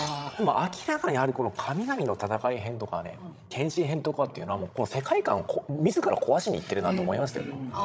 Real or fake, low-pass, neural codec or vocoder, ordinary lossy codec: fake; none; codec, 16 kHz, 16 kbps, FreqCodec, larger model; none